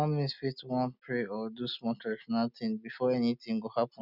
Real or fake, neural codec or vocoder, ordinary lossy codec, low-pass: real; none; none; 5.4 kHz